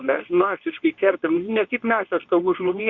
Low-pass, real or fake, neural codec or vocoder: 7.2 kHz; fake; codec, 24 kHz, 0.9 kbps, WavTokenizer, medium speech release version 2